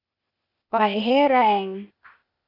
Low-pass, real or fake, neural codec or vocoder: 5.4 kHz; fake; codec, 16 kHz, 0.8 kbps, ZipCodec